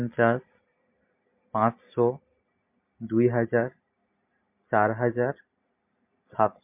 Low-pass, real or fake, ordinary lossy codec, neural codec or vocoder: 3.6 kHz; real; MP3, 32 kbps; none